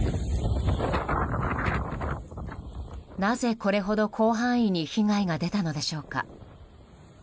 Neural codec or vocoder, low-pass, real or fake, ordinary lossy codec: none; none; real; none